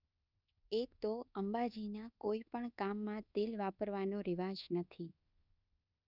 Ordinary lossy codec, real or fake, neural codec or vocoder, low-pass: none; fake; codec, 24 kHz, 1.2 kbps, DualCodec; 5.4 kHz